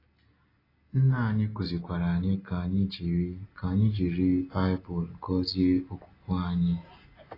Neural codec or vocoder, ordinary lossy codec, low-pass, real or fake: none; AAC, 24 kbps; 5.4 kHz; real